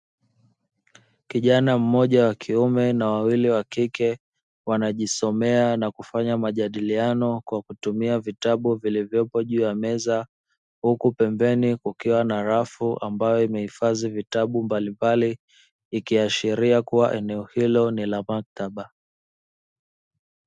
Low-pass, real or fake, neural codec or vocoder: 10.8 kHz; real; none